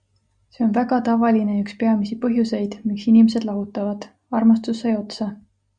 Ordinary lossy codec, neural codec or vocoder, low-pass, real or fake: Opus, 64 kbps; none; 9.9 kHz; real